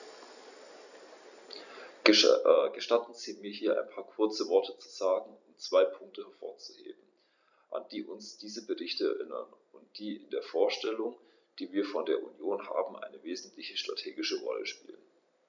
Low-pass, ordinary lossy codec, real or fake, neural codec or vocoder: 7.2 kHz; none; real; none